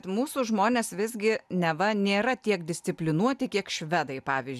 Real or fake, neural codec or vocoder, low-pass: real; none; 14.4 kHz